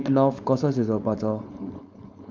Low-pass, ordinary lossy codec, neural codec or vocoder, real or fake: none; none; codec, 16 kHz, 4.8 kbps, FACodec; fake